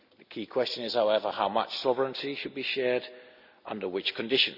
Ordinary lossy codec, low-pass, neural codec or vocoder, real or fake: none; 5.4 kHz; none; real